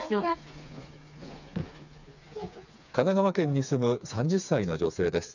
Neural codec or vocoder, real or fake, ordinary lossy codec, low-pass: codec, 16 kHz, 4 kbps, FreqCodec, smaller model; fake; none; 7.2 kHz